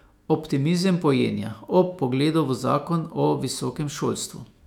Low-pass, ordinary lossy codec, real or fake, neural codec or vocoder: 19.8 kHz; none; fake; autoencoder, 48 kHz, 128 numbers a frame, DAC-VAE, trained on Japanese speech